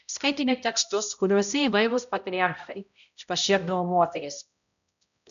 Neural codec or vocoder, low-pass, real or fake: codec, 16 kHz, 0.5 kbps, X-Codec, HuBERT features, trained on balanced general audio; 7.2 kHz; fake